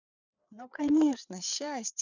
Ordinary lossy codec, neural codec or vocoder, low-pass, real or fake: none; codec, 16 kHz, 4 kbps, FreqCodec, larger model; 7.2 kHz; fake